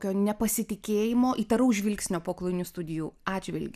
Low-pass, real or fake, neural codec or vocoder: 14.4 kHz; real; none